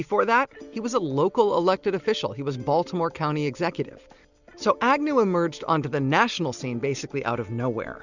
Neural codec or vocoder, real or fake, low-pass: none; real; 7.2 kHz